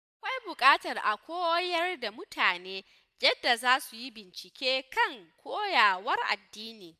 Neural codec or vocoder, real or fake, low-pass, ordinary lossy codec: none; real; 14.4 kHz; none